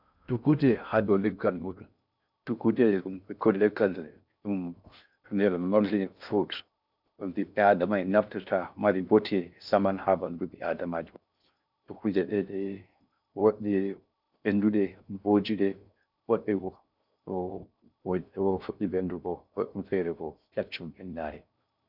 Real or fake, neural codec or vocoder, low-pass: fake; codec, 16 kHz in and 24 kHz out, 0.6 kbps, FocalCodec, streaming, 2048 codes; 5.4 kHz